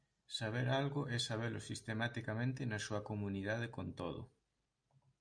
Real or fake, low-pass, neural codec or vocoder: real; 9.9 kHz; none